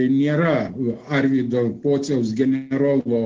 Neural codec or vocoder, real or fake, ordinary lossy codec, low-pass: none; real; Opus, 16 kbps; 7.2 kHz